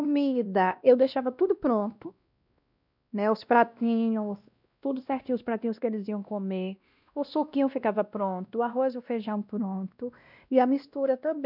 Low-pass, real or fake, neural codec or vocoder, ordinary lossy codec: 5.4 kHz; fake; codec, 16 kHz, 1 kbps, X-Codec, WavLM features, trained on Multilingual LibriSpeech; none